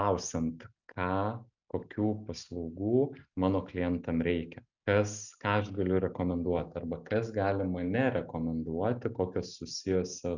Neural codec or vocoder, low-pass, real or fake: none; 7.2 kHz; real